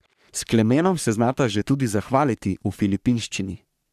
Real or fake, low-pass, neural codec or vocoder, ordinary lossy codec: fake; 14.4 kHz; codec, 44.1 kHz, 3.4 kbps, Pupu-Codec; none